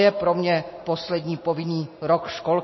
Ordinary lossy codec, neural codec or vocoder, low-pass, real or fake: MP3, 24 kbps; none; 7.2 kHz; real